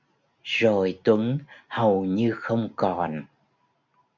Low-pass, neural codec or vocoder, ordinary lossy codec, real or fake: 7.2 kHz; none; MP3, 64 kbps; real